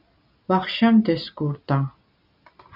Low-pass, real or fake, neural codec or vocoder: 5.4 kHz; real; none